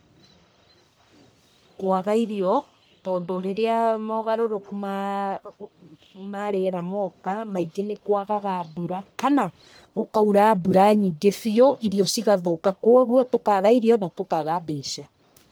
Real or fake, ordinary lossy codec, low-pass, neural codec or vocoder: fake; none; none; codec, 44.1 kHz, 1.7 kbps, Pupu-Codec